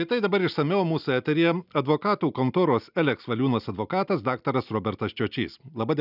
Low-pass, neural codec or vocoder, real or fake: 5.4 kHz; none; real